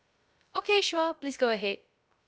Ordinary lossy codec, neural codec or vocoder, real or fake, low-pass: none; codec, 16 kHz, 0.3 kbps, FocalCodec; fake; none